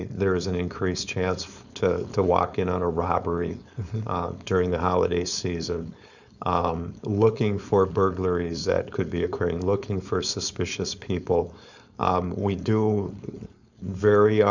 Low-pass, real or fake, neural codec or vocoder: 7.2 kHz; fake; codec, 16 kHz, 4.8 kbps, FACodec